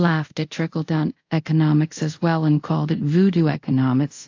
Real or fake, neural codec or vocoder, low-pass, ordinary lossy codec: fake; codec, 24 kHz, 0.5 kbps, DualCodec; 7.2 kHz; AAC, 48 kbps